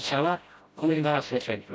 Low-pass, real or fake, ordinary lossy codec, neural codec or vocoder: none; fake; none; codec, 16 kHz, 0.5 kbps, FreqCodec, smaller model